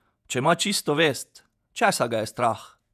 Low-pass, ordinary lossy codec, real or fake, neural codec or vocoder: 14.4 kHz; none; fake; vocoder, 48 kHz, 128 mel bands, Vocos